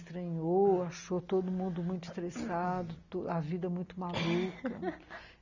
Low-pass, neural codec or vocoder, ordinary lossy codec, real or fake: 7.2 kHz; none; none; real